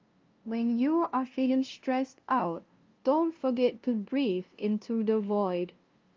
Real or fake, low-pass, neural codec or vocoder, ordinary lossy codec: fake; 7.2 kHz; codec, 16 kHz, 0.5 kbps, FunCodec, trained on LibriTTS, 25 frames a second; Opus, 24 kbps